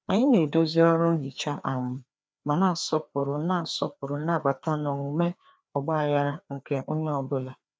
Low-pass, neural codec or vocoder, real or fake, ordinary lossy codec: none; codec, 16 kHz, 2 kbps, FreqCodec, larger model; fake; none